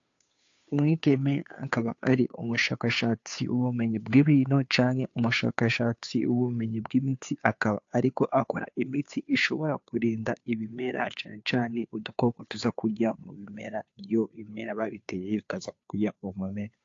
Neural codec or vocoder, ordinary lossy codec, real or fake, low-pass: codec, 16 kHz, 2 kbps, FunCodec, trained on Chinese and English, 25 frames a second; AAC, 48 kbps; fake; 7.2 kHz